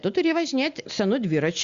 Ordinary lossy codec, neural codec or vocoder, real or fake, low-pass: Opus, 64 kbps; none; real; 7.2 kHz